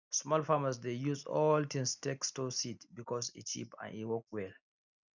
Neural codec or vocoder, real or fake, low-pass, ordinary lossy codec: none; real; 7.2 kHz; none